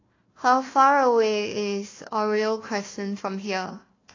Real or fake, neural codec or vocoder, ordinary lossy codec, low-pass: fake; codec, 16 kHz, 1 kbps, FunCodec, trained on Chinese and English, 50 frames a second; AAC, 32 kbps; 7.2 kHz